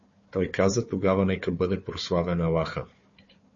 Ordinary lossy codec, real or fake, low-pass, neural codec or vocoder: MP3, 32 kbps; fake; 7.2 kHz; codec, 16 kHz, 4 kbps, FunCodec, trained on Chinese and English, 50 frames a second